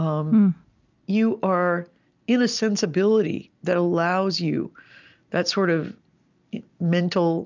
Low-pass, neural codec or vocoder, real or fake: 7.2 kHz; none; real